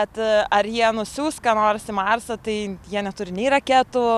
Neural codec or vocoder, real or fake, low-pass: none; real; 14.4 kHz